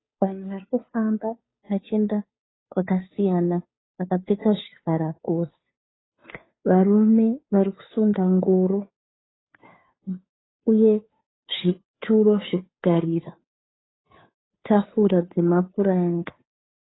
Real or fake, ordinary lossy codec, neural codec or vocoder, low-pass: fake; AAC, 16 kbps; codec, 16 kHz, 2 kbps, FunCodec, trained on Chinese and English, 25 frames a second; 7.2 kHz